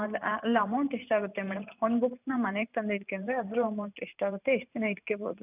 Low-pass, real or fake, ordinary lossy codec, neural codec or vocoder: 3.6 kHz; fake; none; vocoder, 44.1 kHz, 128 mel bands every 512 samples, BigVGAN v2